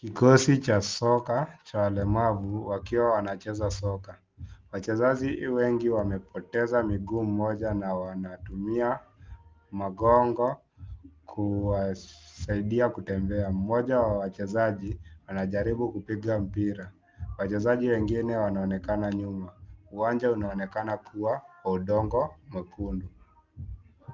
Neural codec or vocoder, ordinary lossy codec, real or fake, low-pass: none; Opus, 32 kbps; real; 7.2 kHz